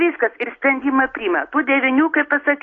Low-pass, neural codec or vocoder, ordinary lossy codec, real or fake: 7.2 kHz; none; AAC, 32 kbps; real